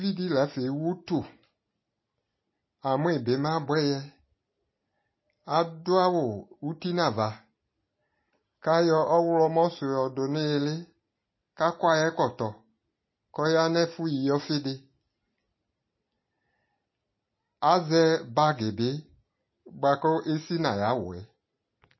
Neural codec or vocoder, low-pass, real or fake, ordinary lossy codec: none; 7.2 kHz; real; MP3, 24 kbps